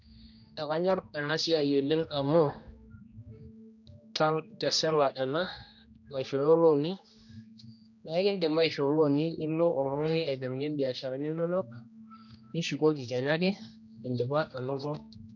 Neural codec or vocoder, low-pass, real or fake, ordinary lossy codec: codec, 16 kHz, 1 kbps, X-Codec, HuBERT features, trained on general audio; 7.2 kHz; fake; none